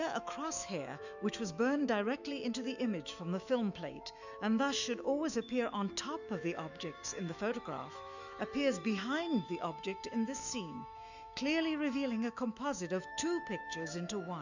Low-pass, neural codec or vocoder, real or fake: 7.2 kHz; autoencoder, 48 kHz, 128 numbers a frame, DAC-VAE, trained on Japanese speech; fake